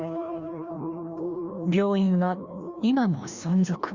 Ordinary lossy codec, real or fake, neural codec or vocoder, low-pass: Opus, 64 kbps; fake; codec, 16 kHz, 1 kbps, FreqCodec, larger model; 7.2 kHz